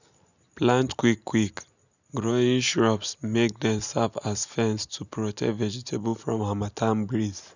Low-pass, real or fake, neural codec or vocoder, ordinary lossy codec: 7.2 kHz; real; none; none